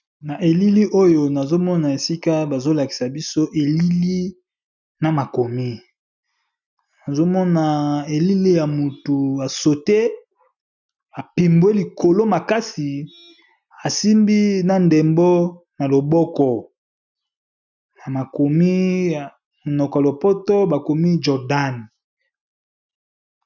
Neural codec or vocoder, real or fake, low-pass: none; real; 7.2 kHz